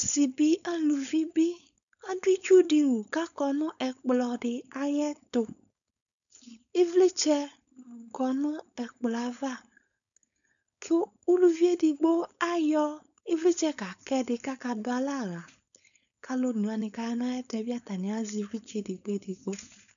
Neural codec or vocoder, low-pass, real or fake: codec, 16 kHz, 4.8 kbps, FACodec; 7.2 kHz; fake